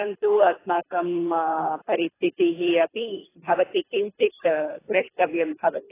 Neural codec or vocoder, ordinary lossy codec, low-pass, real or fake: codec, 24 kHz, 3 kbps, HILCodec; AAC, 16 kbps; 3.6 kHz; fake